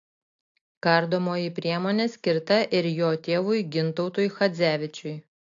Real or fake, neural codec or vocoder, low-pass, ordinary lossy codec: real; none; 7.2 kHz; AAC, 48 kbps